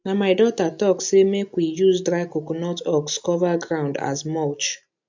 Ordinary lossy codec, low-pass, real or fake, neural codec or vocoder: MP3, 64 kbps; 7.2 kHz; real; none